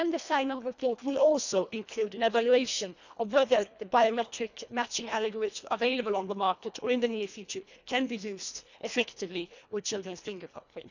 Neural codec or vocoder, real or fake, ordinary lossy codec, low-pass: codec, 24 kHz, 1.5 kbps, HILCodec; fake; none; 7.2 kHz